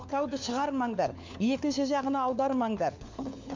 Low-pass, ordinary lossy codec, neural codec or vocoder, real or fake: 7.2 kHz; MP3, 64 kbps; codec, 16 kHz, 4 kbps, FunCodec, trained on LibriTTS, 50 frames a second; fake